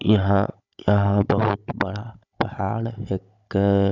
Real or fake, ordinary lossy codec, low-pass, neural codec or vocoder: fake; none; 7.2 kHz; codec, 16 kHz, 16 kbps, FunCodec, trained on Chinese and English, 50 frames a second